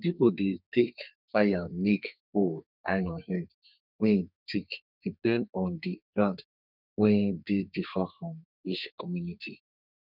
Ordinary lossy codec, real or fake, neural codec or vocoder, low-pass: none; fake; codec, 32 kHz, 1.9 kbps, SNAC; 5.4 kHz